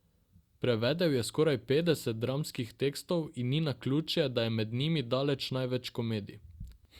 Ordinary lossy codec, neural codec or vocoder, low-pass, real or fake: Opus, 64 kbps; none; 19.8 kHz; real